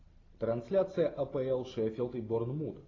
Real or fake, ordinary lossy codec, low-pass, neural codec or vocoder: real; AAC, 32 kbps; 7.2 kHz; none